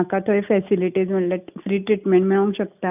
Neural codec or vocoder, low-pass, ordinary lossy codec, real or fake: none; 3.6 kHz; none; real